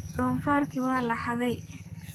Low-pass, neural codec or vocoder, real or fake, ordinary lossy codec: none; codec, 44.1 kHz, 2.6 kbps, SNAC; fake; none